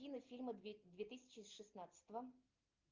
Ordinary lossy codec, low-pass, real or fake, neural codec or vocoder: Opus, 32 kbps; 7.2 kHz; real; none